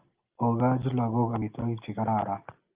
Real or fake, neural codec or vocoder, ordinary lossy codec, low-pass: real; none; Opus, 32 kbps; 3.6 kHz